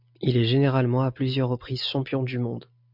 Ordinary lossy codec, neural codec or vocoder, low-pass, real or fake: MP3, 48 kbps; none; 5.4 kHz; real